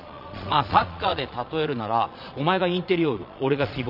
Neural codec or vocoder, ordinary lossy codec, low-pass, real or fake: vocoder, 22.05 kHz, 80 mel bands, Vocos; none; 5.4 kHz; fake